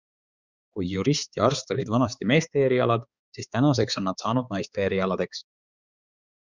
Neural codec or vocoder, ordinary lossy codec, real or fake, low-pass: codec, 16 kHz, 4 kbps, X-Codec, HuBERT features, trained on balanced general audio; Opus, 64 kbps; fake; 7.2 kHz